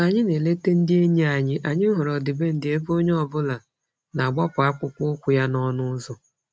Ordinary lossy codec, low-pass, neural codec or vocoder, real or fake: none; none; none; real